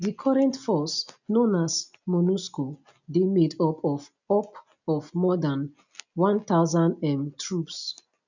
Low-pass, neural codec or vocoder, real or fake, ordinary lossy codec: 7.2 kHz; none; real; none